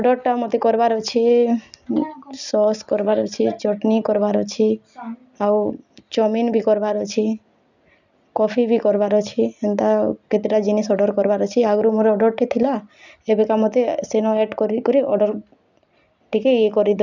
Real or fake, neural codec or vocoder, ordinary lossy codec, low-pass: real; none; none; 7.2 kHz